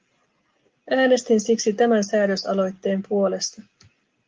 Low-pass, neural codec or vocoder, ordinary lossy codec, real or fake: 7.2 kHz; none; Opus, 32 kbps; real